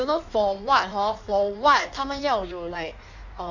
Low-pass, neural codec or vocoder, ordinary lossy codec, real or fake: 7.2 kHz; codec, 16 kHz in and 24 kHz out, 1.1 kbps, FireRedTTS-2 codec; none; fake